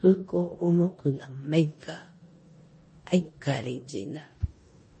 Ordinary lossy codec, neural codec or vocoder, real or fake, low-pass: MP3, 32 kbps; codec, 16 kHz in and 24 kHz out, 0.9 kbps, LongCat-Audio-Codec, four codebook decoder; fake; 10.8 kHz